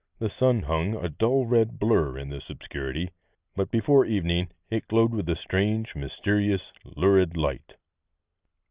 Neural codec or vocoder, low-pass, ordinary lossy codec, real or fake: none; 3.6 kHz; Opus, 64 kbps; real